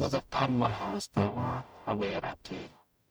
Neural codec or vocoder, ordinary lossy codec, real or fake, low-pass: codec, 44.1 kHz, 0.9 kbps, DAC; none; fake; none